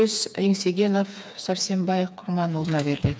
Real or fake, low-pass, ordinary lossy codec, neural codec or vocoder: fake; none; none; codec, 16 kHz, 4 kbps, FreqCodec, smaller model